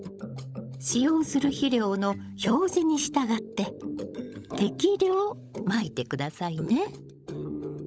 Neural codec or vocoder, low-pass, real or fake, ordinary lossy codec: codec, 16 kHz, 16 kbps, FunCodec, trained on LibriTTS, 50 frames a second; none; fake; none